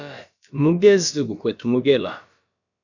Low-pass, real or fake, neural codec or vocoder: 7.2 kHz; fake; codec, 16 kHz, about 1 kbps, DyCAST, with the encoder's durations